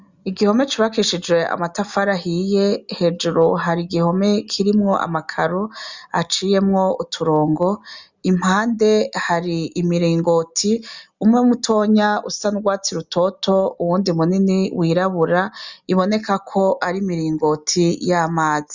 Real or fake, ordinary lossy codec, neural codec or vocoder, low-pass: real; Opus, 64 kbps; none; 7.2 kHz